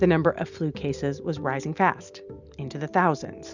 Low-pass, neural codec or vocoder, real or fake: 7.2 kHz; none; real